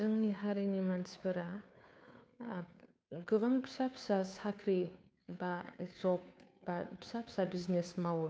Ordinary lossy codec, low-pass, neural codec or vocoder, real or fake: none; none; codec, 16 kHz, 2 kbps, FunCodec, trained on Chinese and English, 25 frames a second; fake